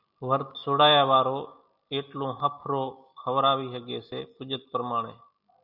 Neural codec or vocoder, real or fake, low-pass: none; real; 5.4 kHz